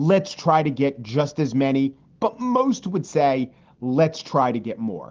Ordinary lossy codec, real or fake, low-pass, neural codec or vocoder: Opus, 16 kbps; real; 7.2 kHz; none